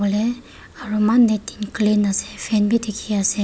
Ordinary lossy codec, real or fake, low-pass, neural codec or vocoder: none; real; none; none